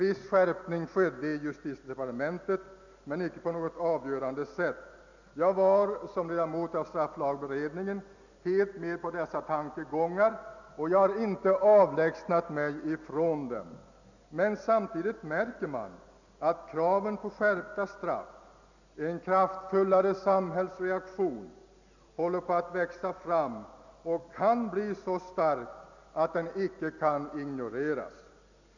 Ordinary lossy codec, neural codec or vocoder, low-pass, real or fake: none; none; 7.2 kHz; real